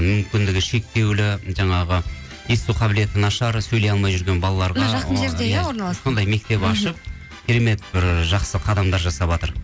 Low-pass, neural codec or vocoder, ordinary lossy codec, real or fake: none; none; none; real